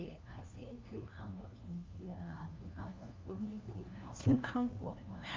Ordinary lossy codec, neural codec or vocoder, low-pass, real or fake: Opus, 24 kbps; codec, 16 kHz, 1 kbps, FunCodec, trained on LibriTTS, 50 frames a second; 7.2 kHz; fake